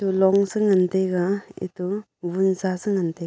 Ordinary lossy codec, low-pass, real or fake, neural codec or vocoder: none; none; real; none